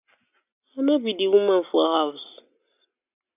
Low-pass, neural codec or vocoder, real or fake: 3.6 kHz; none; real